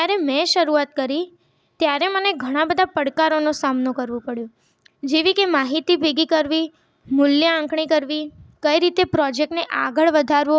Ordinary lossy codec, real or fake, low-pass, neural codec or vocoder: none; real; none; none